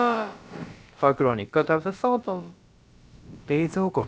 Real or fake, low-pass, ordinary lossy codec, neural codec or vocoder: fake; none; none; codec, 16 kHz, about 1 kbps, DyCAST, with the encoder's durations